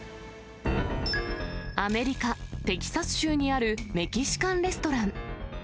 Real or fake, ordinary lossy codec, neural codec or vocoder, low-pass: real; none; none; none